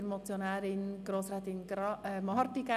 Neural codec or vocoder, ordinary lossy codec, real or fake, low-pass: none; none; real; 14.4 kHz